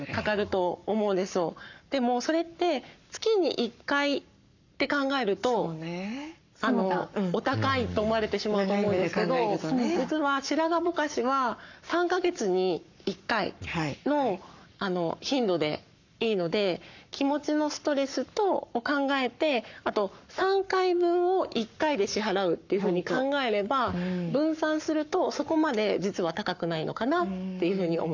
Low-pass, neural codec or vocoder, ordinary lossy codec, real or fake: 7.2 kHz; codec, 44.1 kHz, 7.8 kbps, Pupu-Codec; none; fake